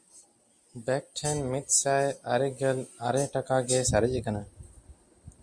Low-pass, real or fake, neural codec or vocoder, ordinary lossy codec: 9.9 kHz; real; none; Opus, 64 kbps